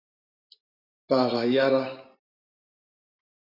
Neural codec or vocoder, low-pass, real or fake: vocoder, 44.1 kHz, 128 mel bands every 512 samples, BigVGAN v2; 5.4 kHz; fake